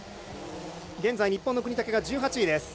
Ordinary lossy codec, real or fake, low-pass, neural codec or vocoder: none; real; none; none